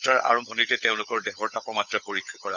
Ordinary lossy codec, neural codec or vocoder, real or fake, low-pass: none; codec, 16 kHz, 16 kbps, FunCodec, trained on LibriTTS, 50 frames a second; fake; 7.2 kHz